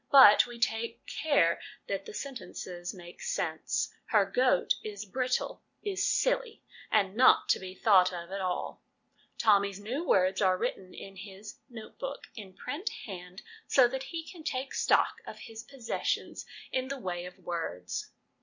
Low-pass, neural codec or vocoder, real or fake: 7.2 kHz; none; real